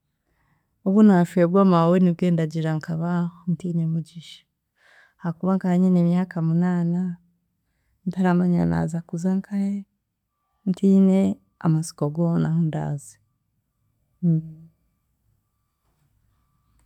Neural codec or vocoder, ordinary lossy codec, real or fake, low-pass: none; none; real; 19.8 kHz